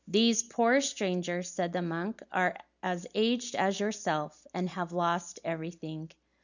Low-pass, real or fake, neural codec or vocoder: 7.2 kHz; real; none